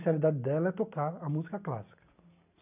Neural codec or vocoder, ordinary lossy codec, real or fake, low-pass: none; none; real; 3.6 kHz